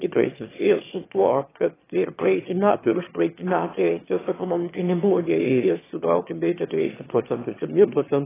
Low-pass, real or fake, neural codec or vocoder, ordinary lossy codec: 3.6 kHz; fake; autoencoder, 22.05 kHz, a latent of 192 numbers a frame, VITS, trained on one speaker; AAC, 16 kbps